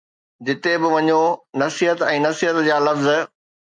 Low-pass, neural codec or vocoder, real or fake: 9.9 kHz; none; real